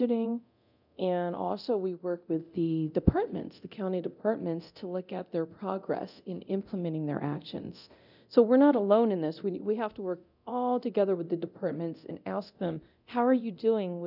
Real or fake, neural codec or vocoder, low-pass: fake; codec, 24 kHz, 0.9 kbps, DualCodec; 5.4 kHz